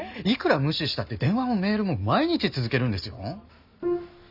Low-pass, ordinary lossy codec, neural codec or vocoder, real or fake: 5.4 kHz; none; none; real